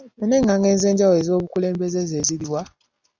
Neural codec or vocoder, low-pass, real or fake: none; 7.2 kHz; real